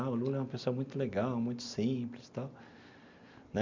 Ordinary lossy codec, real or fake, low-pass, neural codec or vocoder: MP3, 64 kbps; real; 7.2 kHz; none